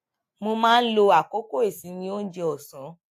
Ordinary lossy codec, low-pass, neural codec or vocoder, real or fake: AAC, 64 kbps; 14.4 kHz; none; real